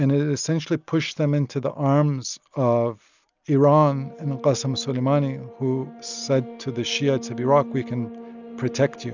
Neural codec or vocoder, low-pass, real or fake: none; 7.2 kHz; real